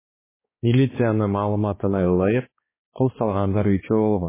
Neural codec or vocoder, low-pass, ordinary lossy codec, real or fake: codec, 16 kHz, 4 kbps, X-Codec, HuBERT features, trained on balanced general audio; 3.6 kHz; MP3, 16 kbps; fake